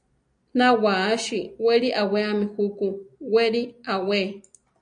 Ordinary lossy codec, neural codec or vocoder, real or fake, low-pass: MP3, 64 kbps; none; real; 9.9 kHz